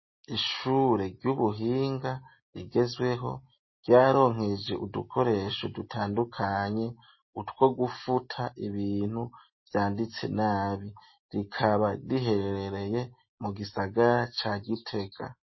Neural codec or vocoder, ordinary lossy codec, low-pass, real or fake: none; MP3, 24 kbps; 7.2 kHz; real